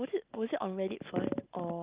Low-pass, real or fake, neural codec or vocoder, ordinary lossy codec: 3.6 kHz; real; none; Opus, 32 kbps